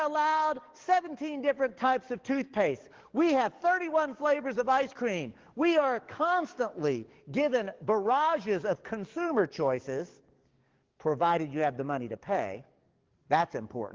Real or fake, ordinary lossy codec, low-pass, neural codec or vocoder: fake; Opus, 16 kbps; 7.2 kHz; codec, 44.1 kHz, 7.8 kbps, DAC